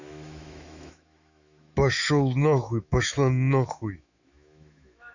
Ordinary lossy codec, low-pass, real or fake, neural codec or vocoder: none; 7.2 kHz; fake; autoencoder, 48 kHz, 128 numbers a frame, DAC-VAE, trained on Japanese speech